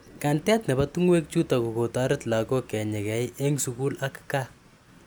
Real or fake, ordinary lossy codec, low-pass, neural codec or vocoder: real; none; none; none